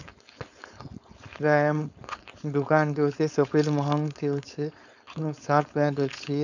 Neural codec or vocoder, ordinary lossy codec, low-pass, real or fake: codec, 16 kHz, 4.8 kbps, FACodec; none; 7.2 kHz; fake